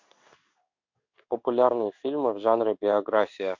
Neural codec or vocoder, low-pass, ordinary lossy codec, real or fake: codec, 16 kHz in and 24 kHz out, 1 kbps, XY-Tokenizer; 7.2 kHz; MP3, 48 kbps; fake